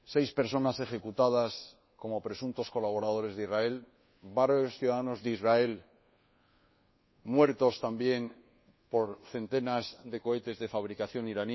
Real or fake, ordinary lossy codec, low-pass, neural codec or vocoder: fake; MP3, 24 kbps; 7.2 kHz; codec, 24 kHz, 1.2 kbps, DualCodec